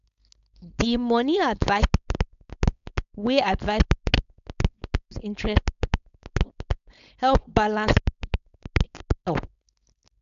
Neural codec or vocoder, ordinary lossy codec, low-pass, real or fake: codec, 16 kHz, 4.8 kbps, FACodec; none; 7.2 kHz; fake